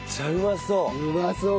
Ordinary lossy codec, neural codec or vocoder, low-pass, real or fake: none; none; none; real